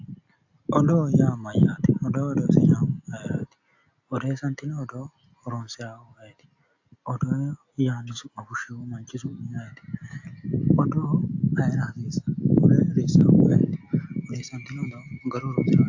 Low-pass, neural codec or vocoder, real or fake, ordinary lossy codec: 7.2 kHz; none; real; AAC, 48 kbps